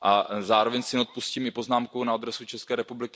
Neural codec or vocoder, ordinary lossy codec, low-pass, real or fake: none; none; none; real